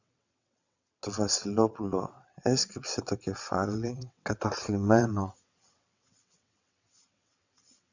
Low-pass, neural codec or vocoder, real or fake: 7.2 kHz; vocoder, 22.05 kHz, 80 mel bands, WaveNeXt; fake